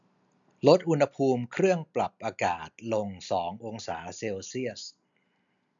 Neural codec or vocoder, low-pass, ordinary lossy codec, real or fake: none; 7.2 kHz; none; real